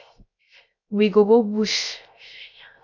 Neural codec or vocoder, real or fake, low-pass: codec, 16 kHz, 0.3 kbps, FocalCodec; fake; 7.2 kHz